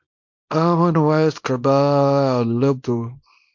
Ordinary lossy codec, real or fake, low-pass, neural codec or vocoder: MP3, 48 kbps; fake; 7.2 kHz; codec, 24 kHz, 0.9 kbps, WavTokenizer, small release